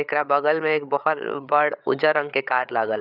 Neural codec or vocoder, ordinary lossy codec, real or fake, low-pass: codec, 16 kHz, 8 kbps, FreqCodec, larger model; none; fake; 5.4 kHz